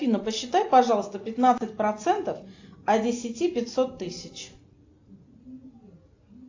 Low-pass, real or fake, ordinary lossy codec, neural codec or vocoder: 7.2 kHz; real; AAC, 48 kbps; none